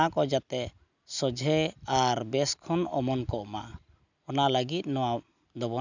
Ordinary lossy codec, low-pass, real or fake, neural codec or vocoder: none; 7.2 kHz; real; none